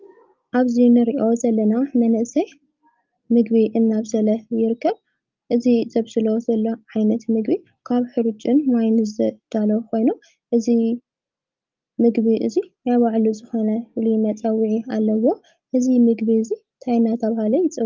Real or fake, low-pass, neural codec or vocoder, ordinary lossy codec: real; 7.2 kHz; none; Opus, 24 kbps